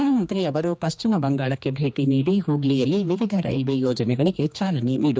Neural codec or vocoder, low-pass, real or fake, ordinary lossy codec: codec, 16 kHz, 2 kbps, X-Codec, HuBERT features, trained on general audio; none; fake; none